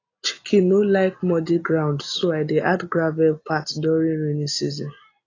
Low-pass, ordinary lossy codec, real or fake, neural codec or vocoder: 7.2 kHz; AAC, 32 kbps; real; none